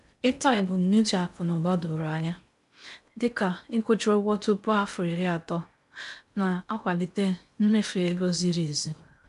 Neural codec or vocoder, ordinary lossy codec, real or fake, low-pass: codec, 16 kHz in and 24 kHz out, 0.6 kbps, FocalCodec, streaming, 2048 codes; none; fake; 10.8 kHz